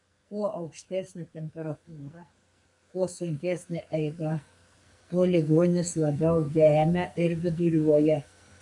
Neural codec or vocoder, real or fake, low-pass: codec, 44.1 kHz, 2.6 kbps, SNAC; fake; 10.8 kHz